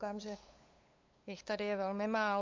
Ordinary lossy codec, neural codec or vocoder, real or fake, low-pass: MP3, 48 kbps; codec, 16 kHz, 8 kbps, FunCodec, trained on LibriTTS, 25 frames a second; fake; 7.2 kHz